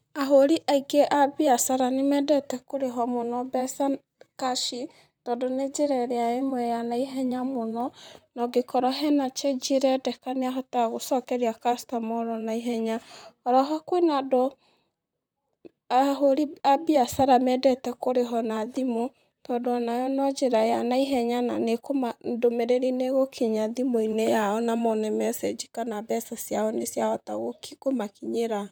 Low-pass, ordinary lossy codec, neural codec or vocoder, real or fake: none; none; vocoder, 44.1 kHz, 128 mel bands, Pupu-Vocoder; fake